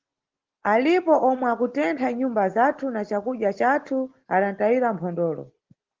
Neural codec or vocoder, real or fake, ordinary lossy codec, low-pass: none; real; Opus, 16 kbps; 7.2 kHz